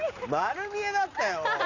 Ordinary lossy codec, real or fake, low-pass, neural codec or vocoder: none; real; 7.2 kHz; none